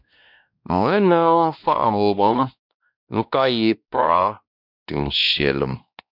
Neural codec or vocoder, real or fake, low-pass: codec, 16 kHz, 1 kbps, X-Codec, WavLM features, trained on Multilingual LibriSpeech; fake; 5.4 kHz